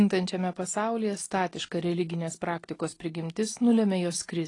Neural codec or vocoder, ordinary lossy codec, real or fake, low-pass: none; AAC, 32 kbps; real; 10.8 kHz